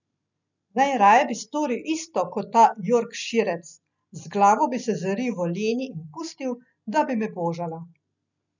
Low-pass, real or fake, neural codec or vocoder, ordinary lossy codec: 7.2 kHz; real; none; none